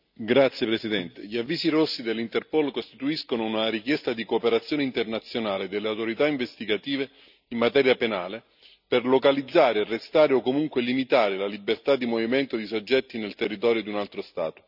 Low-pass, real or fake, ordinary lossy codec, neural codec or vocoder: 5.4 kHz; real; none; none